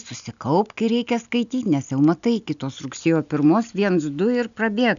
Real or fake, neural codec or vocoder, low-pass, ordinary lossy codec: real; none; 7.2 kHz; MP3, 96 kbps